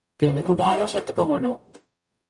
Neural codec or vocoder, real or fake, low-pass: codec, 44.1 kHz, 0.9 kbps, DAC; fake; 10.8 kHz